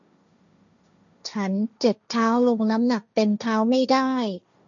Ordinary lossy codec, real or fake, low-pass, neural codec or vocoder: none; fake; 7.2 kHz; codec, 16 kHz, 1.1 kbps, Voila-Tokenizer